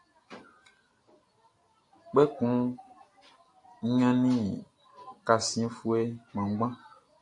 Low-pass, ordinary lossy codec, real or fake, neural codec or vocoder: 10.8 kHz; AAC, 48 kbps; real; none